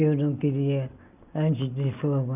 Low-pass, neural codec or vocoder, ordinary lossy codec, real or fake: 3.6 kHz; none; none; real